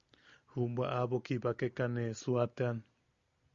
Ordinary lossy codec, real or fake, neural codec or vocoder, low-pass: Opus, 64 kbps; real; none; 7.2 kHz